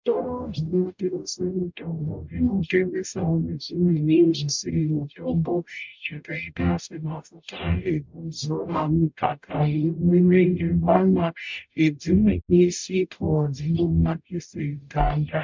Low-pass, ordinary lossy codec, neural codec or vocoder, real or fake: 7.2 kHz; MP3, 64 kbps; codec, 44.1 kHz, 0.9 kbps, DAC; fake